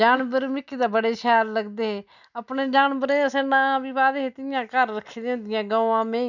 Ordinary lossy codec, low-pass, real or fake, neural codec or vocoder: none; 7.2 kHz; real; none